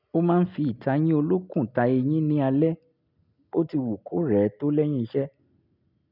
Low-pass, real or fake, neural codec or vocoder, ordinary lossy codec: 5.4 kHz; real; none; none